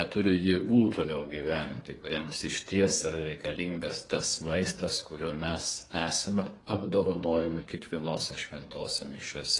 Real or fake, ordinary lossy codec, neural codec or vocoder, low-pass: fake; AAC, 32 kbps; codec, 24 kHz, 1 kbps, SNAC; 10.8 kHz